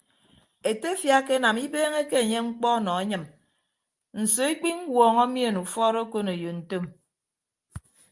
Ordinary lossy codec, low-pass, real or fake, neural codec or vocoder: Opus, 32 kbps; 10.8 kHz; real; none